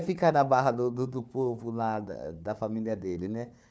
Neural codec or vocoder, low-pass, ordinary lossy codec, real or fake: codec, 16 kHz, 4 kbps, FunCodec, trained on Chinese and English, 50 frames a second; none; none; fake